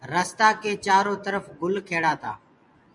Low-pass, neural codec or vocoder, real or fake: 10.8 kHz; vocoder, 24 kHz, 100 mel bands, Vocos; fake